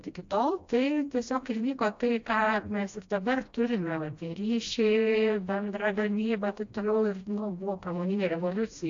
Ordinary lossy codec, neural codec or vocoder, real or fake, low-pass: AAC, 48 kbps; codec, 16 kHz, 1 kbps, FreqCodec, smaller model; fake; 7.2 kHz